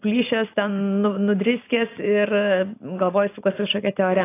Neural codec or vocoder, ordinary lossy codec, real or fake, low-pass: none; AAC, 24 kbps; real; 3.6 kHz